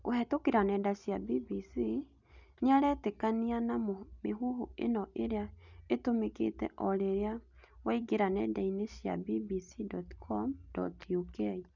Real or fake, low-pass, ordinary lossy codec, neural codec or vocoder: real; 7.2 kHz; none; none